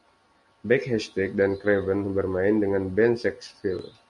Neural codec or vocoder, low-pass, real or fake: none; 10.8 kHz; real